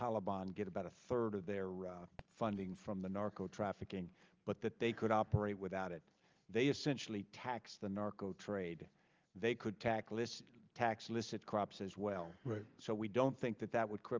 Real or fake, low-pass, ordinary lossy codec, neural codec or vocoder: real; 7.2 kHz; Opus, 24 kbps; none